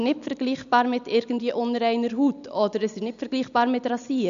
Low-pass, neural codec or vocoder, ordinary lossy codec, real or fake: 7.2 kHz; none; none; real